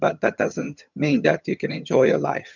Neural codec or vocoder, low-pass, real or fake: vocoder, 22.05 kHz, 80 mel bands, HiFi-GAN; 7.2 kHz; fake